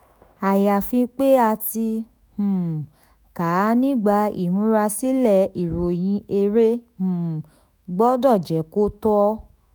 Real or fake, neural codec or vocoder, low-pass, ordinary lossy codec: fake; autoencoder, 48 kHz, 128 numbers a frame, DAC-VAE, trained on Japanese speech; none; none